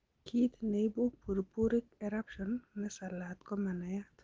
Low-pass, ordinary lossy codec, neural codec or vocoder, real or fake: 7.2 kHz; Opus, 16 kbps; none; real